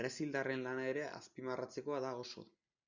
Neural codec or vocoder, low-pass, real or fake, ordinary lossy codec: none; 7.2 kHz; real; Opus, 64 kbps